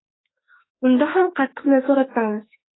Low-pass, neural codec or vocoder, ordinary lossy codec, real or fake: 7.2 kHz; autoencoder, 48 kHz, 32 numbers a frame, DAC-VAE, trained on Japanese speech; AAC, 16 kbps; fake